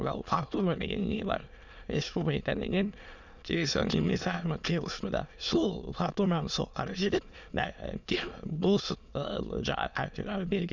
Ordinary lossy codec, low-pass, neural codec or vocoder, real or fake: none; 7.2 kHz; autoencoder, 22.05 kHz, a latent of 192 numbers a frame, VITS, trained on many speakers; fake